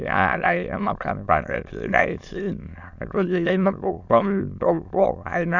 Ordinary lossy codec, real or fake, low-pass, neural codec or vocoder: none; fake; 7.2 kHz; autoencoder, 22.05 kHz, a latent of 192 numbers a frame, VITS, trained on many speakers